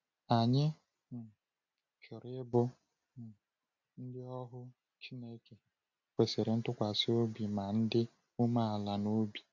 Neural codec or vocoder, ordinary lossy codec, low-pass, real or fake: none; none; 7.2 kHz; real